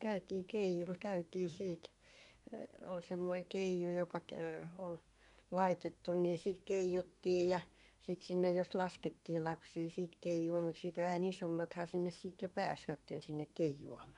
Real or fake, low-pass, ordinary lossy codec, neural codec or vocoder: fake; 10.8 kHz; none; codec, 24 kHz, 1 kbps, SNAC